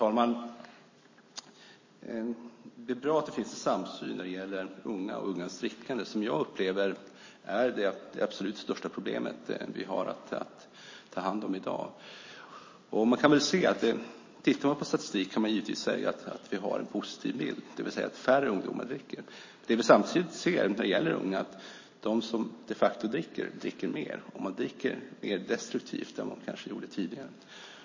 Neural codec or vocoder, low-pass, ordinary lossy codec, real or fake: none; 7.2 kHz; MP3, 32 kbps; real